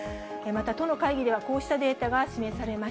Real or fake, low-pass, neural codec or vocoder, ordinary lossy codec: real; none; none; none